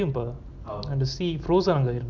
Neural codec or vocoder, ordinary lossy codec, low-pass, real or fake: none; none; 7.2 kHz; real